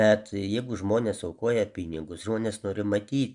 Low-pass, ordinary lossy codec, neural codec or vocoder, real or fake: 10.8 kHz; MP3, 96 kbps; none; real